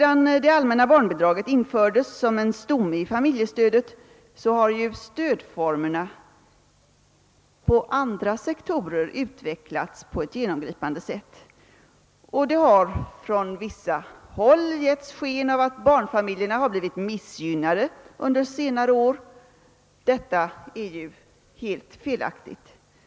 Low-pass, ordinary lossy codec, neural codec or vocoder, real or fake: none; none; none; real